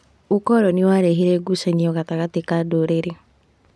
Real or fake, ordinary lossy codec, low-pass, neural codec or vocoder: real; none; none; none